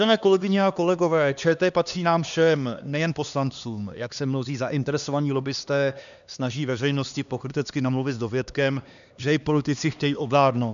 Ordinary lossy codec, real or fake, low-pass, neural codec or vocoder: MP3, 96 kbps; fake; 7.2 kHz; codec, 16 kHz, 2 kbps, X-Codec, HuBERT features, trained on LibriSpeech